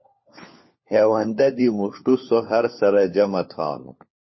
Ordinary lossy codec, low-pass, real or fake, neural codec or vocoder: MP3, 24 kbps; 7.2 kHz; fake; codec, 16 kHz, 4 kbps, FunCodec, trained on LibriTTS, 50 frames a second